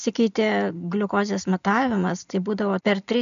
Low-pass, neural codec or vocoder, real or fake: 7.2 kHz; none; real